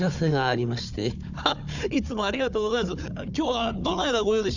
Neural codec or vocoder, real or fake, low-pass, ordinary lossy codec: codec, 16 kHz, 4 kbps, FunCodec, trained on Chinese and English, 50 frames a second; fake; 7.2 kHz; none